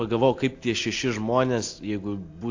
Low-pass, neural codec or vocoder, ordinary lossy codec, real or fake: 7.2 kHz; none; AAC, 48 kbps; real